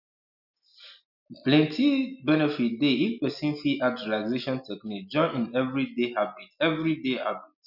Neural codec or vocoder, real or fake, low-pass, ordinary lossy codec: none; real; 5.4 kHz; none